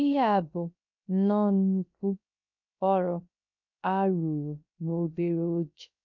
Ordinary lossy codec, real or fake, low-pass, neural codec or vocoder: none; fake; 7.2 kHz; codec, 16 kHz, 0.3 kbps, FocalCodec